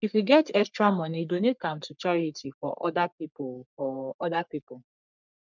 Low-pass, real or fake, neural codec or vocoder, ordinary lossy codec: 7.2 kHz; fake; codec, 44.1 kHz, 3.4 kbps, Pupu-Codec; none